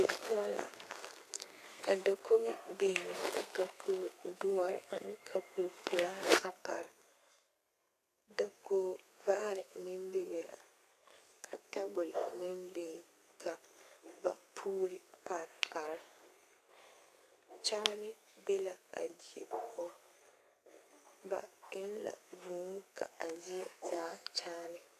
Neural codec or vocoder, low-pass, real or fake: codec, 32 kHz, 1.9 kbps, SNAC; 14.4 kHz; fake